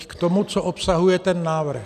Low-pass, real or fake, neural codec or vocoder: 14.4 kHz; real; none